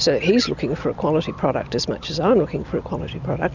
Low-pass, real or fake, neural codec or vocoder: 7.2 kHz; real; none